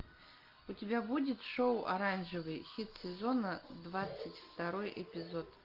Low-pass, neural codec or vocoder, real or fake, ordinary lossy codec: 5.4 kHz; none; real; Opus, 24 kbps